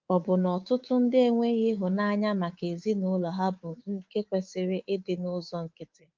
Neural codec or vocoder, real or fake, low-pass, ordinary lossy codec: codec, 24 kHz, 3.1 kbps, DualCodec; fake; 7.2 kHz; Opus, 32 kbps